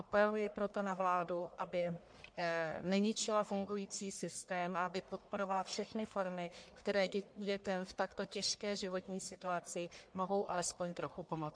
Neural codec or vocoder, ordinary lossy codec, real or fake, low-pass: codec, 44.1 kHz, 1.7 kbps, Pupu-Codec; MP3, 64 kbps; fake; 9.9 kHz